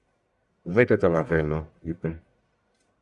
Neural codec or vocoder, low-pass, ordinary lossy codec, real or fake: codec, 44.1 kHz, 1.7 kbps, Pupu-Codec; 10.8 kHz; Opus, 64 kbps; fake